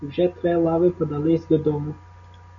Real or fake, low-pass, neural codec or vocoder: real; 7.2 kHz; none